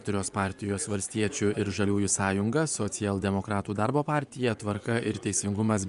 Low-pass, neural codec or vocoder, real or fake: 10.8 kHz; vocoder, 24 kHz, 100 mel bands, Vocos; fake